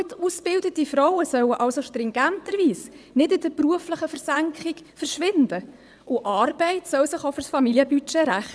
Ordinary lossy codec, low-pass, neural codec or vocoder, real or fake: none; none; vocoder, 22.05 kHz, 80 mel bands, WaveNeXt; fake